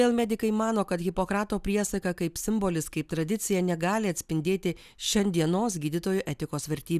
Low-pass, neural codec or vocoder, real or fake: 14.4 kHz; none; real